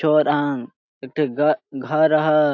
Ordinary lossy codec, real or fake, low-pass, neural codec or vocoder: AAC, 48 kbps; real; 7.2 kHz; none